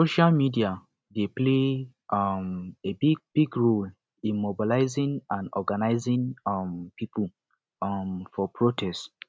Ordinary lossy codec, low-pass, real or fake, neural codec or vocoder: none; none; real; none